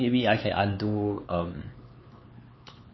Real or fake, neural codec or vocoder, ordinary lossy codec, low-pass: fake; codec, 16 kHz, 4 kbps, X-Codec, HuBERT features, trained on LibriSpeech; MP3, 24 kbps; 7.2 kHz